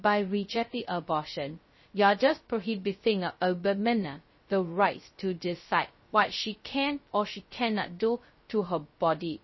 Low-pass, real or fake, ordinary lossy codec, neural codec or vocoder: 7.2 kHz; fake; MP3, 24 kbps; codec, 16 kHz, 0.2 kbps, FocalCodec